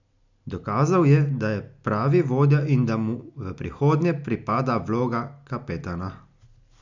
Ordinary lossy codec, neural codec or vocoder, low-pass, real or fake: none; none; 7.2 kHz; real